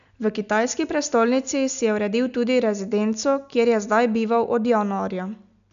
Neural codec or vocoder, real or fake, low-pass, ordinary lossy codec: none; real; 7.2 kHz; none